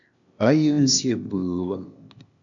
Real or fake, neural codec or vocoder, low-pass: fake; codec, 16 kHz, 0.8 kbps, ZipCodec; 7.2 kHz